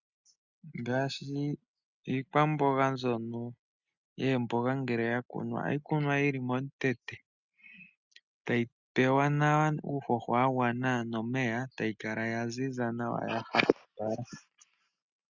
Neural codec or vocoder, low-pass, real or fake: none; 7.2 kHz; real